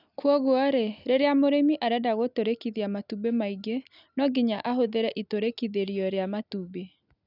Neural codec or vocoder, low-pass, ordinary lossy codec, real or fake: none; 5.4 kHz; none; real